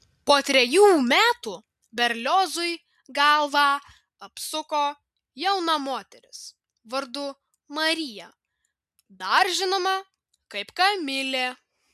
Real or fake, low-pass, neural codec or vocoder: real; 14.4 kHz; none